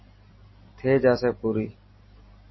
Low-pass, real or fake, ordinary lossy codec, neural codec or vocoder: 7.2 kHz; real; MP3, 24 kbps; none